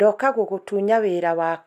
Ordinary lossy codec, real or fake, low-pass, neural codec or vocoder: none; real; 14.4 kHz; none